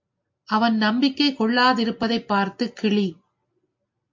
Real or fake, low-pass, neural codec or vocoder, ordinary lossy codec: real; 7.2 kHz; none; MP3, 48 kbps